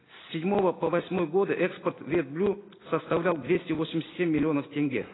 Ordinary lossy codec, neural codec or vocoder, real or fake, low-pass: AAC, 16 kbps; none; real; 7.2 kHz